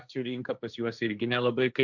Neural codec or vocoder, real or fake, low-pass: codec, 16 kHz, 1.1 kbps, Voila-Tokenizer; fake; 7.2 kHz